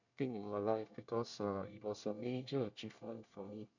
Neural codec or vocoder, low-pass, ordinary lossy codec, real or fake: codec, 24 kHz, 1 kbps, SNAC; 7.2 kHz; none; fake